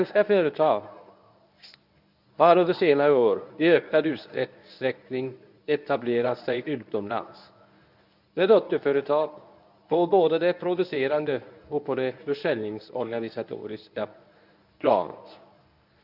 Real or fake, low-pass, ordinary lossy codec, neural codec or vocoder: fake; 5.4 kHz; none; codec, 24 kHz, 0.9 kbps, WavTokenizer, medium speech release version 1